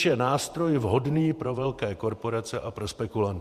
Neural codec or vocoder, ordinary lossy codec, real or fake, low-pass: vocoder, 48 kHz, 128 mel bands, Vocos; Opus, 64 kbps; fake; 14.4 kHz